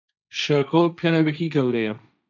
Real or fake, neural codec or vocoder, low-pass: fake; codec, 16 kHz, 1.1 kbps, Voila-Tokenizer; 7.2 kHz